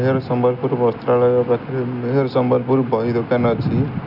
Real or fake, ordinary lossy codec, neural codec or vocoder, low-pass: real; none; none; 5.4 kHz